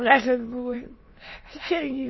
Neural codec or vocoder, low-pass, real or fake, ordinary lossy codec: autoencoder, 22.05 kHz, a latent of 192 numbers a frame, VITS, trained on many speakers; 7.2 kHz; fake; MP3, 24 kbps